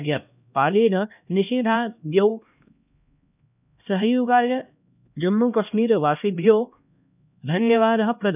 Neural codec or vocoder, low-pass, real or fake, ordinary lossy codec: codec, 16 kHz, 1 kbps, X-Codec, HuBERT features, trained on LibriSpeech; 3.6 kHz; fake; none